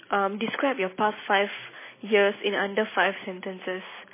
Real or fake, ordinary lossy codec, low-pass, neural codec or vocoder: real; MP3, 16 kbps; 3.6 kHz; none